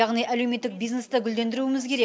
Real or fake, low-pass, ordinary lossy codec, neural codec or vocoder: real; none; none; none